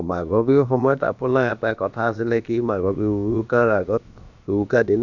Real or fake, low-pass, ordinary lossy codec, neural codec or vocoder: fake; 7.2 kHz; none; codec, 16 kHz, about 1 kbps, DyCAST, with the encoder's durations